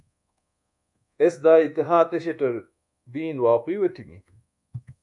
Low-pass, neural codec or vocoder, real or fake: 10.8 kHz; codec, 24 kHz, 1.2 kbps, DualCodec; fake